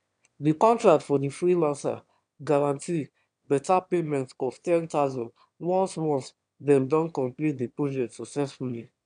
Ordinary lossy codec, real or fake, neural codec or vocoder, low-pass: none; fake; autoencoder, 22.05 kHz, a latent of 192 numbers a frame, VITS, trained on one speaker; 9.9 kHz